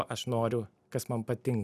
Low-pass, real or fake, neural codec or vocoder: 14.4 kHz; real; none